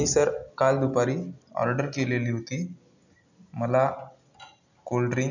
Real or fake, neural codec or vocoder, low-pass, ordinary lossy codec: real; none; 7.2 kHz; none